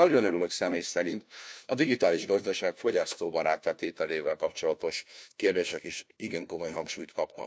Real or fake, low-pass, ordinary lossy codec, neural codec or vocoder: fake; none; none; codec, 16 kHz, 1 kbps, FunCodec, trained on LibriTTS, 50 frames a second